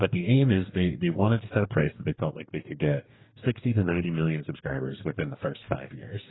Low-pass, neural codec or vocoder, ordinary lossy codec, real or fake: 7.2 kHz; codec, 44.1 kHz, 2.6 kbps, DAC; AAC, 16 kbps; fake